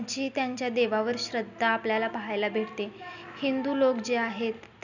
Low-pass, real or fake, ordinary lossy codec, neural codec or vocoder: 7.2 kHz; real; none; none